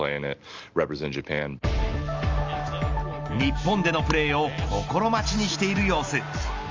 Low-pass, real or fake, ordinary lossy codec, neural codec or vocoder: 7.2 kHz; real; Opus, 32 kbps; none